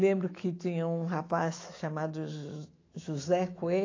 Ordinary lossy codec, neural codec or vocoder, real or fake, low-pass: AAC, 32 kbps; autoencoder, 48 kHz, 128 numbers a frame, DAC-VAE, trained on Japanese speech; fake; 7.2 kHz